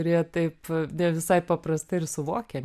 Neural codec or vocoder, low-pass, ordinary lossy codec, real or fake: none; 14.4 kHz; AAC, 96 kbps; real